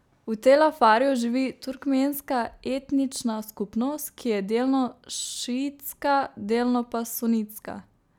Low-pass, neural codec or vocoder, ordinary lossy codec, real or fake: 19.8 kHz; none; none; real